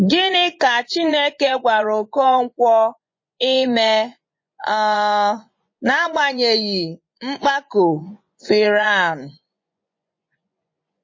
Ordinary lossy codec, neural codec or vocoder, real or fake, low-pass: MP3, 32 kbps; vocoder, 24 kHz, 100 mel bands, Vocos; fake; 7.2 kHz